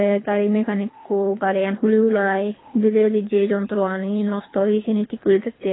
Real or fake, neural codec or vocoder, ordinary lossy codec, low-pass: fake; codec, 24 kHz, 3 kbps, HILCodec; AAC, 16 kbps; 7.2 kHz